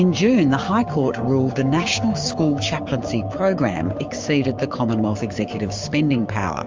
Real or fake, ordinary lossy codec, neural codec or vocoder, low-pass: fake; Opus, 32 kbps; codec, 16 kHz, 6 kbps, DAC; 7.2 kHz